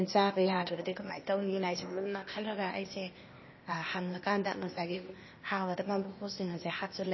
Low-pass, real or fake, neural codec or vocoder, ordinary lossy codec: 7.2 kHz; fake; codec, 16 kHz, 0.8 kbps, ZipCodec; MP3, 24 kbps